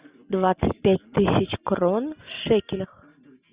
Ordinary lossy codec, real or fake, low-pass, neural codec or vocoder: Opus, 64 kbps; real; 3.6 kHz; none